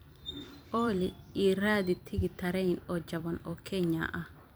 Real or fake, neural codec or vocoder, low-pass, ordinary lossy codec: fake; vocoder, 44.1 kHz, 128 mel bands every 256 samples, BigVGAN v2; none; none